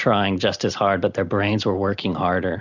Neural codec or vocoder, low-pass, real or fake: none; 7.2 kHz; real